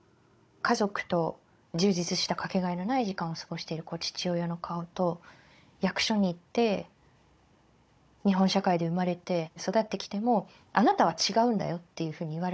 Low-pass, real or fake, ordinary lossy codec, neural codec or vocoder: none; fake; none; codec, 16 kHz, 16 kbps, FunCodec, trained on Chinese and English, 50 frames a second